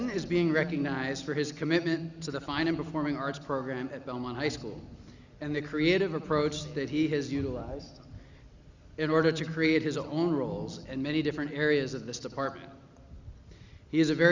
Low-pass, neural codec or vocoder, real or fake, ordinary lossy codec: 7.2 kHz; none; real; Opus, 64 kbps